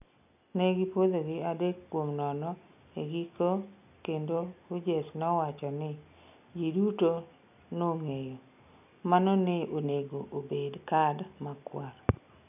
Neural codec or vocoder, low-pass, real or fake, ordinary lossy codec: none; 3.6 kHz; real; none